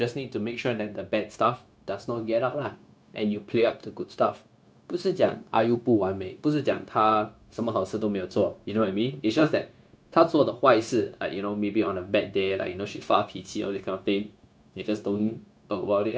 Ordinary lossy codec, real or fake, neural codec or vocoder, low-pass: none; fake; codec, 16 kHz, 0.9 kbps, LongCat-Audio-Codec; none